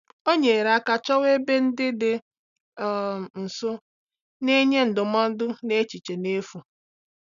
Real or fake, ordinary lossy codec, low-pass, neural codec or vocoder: real; none; 7.2 kHz; none